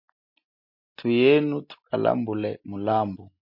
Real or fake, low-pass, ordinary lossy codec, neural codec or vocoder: real; 5.4 kHz; MP3, 32 kbps; none